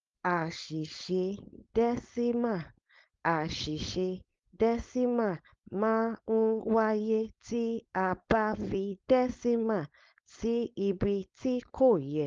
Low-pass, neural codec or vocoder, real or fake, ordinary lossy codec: 7.2 kHz; codec, 16 kHz, 4.8 kbps, FACodec; fake; Opus, 32 kbps